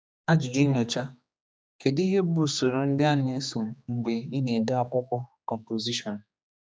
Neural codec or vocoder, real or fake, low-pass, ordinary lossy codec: codec, 16 kHz, 2 kbps, X-Codec, HuBERT features, trained on general audio; fake; none; none